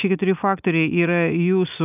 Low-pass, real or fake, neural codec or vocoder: 3.6 kHz; real; none